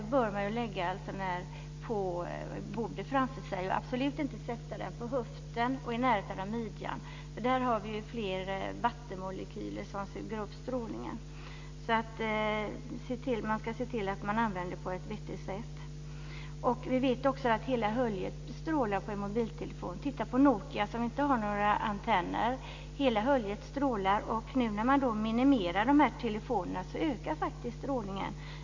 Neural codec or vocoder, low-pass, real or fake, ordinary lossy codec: none; 7.2 kHz; real; MP3, 48 kbps